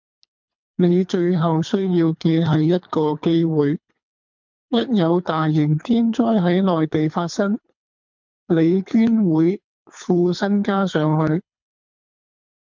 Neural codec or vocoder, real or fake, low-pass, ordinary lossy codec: codec, 24 kHz, 3 kbps, HILCodec; fake; 7.2 kHz; MP3, 64 kbps